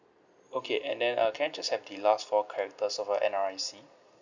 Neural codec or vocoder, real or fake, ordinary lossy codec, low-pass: none; real; none; 7.2 kHz